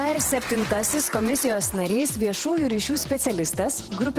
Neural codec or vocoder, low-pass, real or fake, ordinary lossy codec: vocoder, 48 kHz, 128 mel bands, Vocos; 14.4 kHz; fake; Opus, 16 kbps